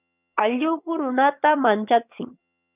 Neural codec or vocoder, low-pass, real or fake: vocoder, 22.05 kHz, 80 mel bands, HiFi-GAN; 3.6 kHz; fake